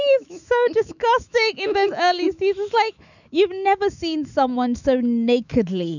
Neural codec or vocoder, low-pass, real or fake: autoencoder, 48 kHz, 128 numbers a frame, DAC-VAE, trained on Japanese speech; 7.2 kHz; fake